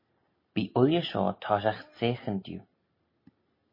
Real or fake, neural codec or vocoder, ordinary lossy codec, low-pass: real; none; MP3, 24 kbps; 5.4 kHz